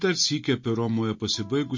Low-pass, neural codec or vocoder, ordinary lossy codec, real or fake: 7.2 kHz; none; MP3, 32 kbps; real